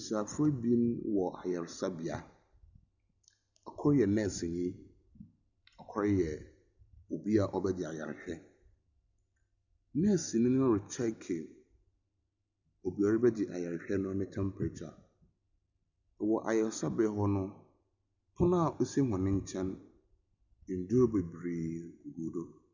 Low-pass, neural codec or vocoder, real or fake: 7.2 kHz; none; real